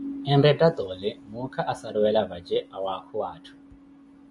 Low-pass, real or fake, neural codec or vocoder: 10.8 kHz; real; none